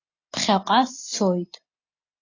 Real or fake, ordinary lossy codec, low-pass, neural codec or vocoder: real; AAC, 32 kbps; 7.2 kHz; none